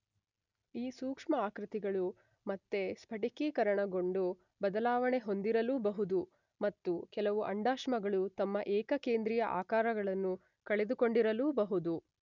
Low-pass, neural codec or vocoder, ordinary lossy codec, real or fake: 7.2 kHz; none; none; real